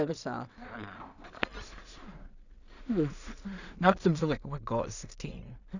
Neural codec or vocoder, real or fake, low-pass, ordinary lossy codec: codec, 16 kHz in and 24 kHz out, 0.4 kbps, LongCat-Audio-Codec, two codebook decoder; fake; 7.2 kHz; none